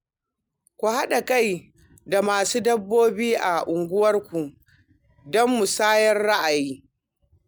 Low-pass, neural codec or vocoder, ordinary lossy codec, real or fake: none; none; none; real